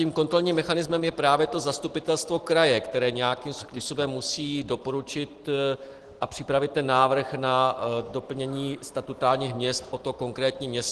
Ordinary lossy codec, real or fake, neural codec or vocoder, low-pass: Opus, 16 kbps; real; none; 10.8 kHz